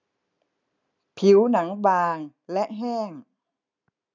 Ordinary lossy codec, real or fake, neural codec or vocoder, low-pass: none; real; none; 7.2 kHz